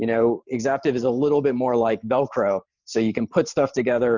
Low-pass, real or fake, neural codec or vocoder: 7.2 kHz; fake; vocoder, 44.1 kHz, 128 mel bands every 512 samples, BigVGAN v2